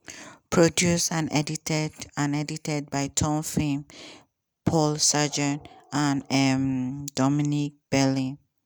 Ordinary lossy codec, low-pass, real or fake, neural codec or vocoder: none; none; real; none